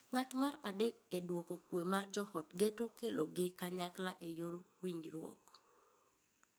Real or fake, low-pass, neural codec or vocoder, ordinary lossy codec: fake; none; codec, 44.1 kHz, 2.6 kbps, SNAC; none